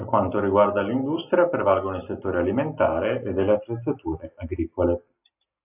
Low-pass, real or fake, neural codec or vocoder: 3.6 kHz; real; none